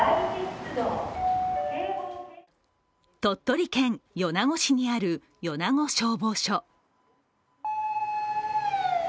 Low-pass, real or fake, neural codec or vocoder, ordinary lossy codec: none; real; none; none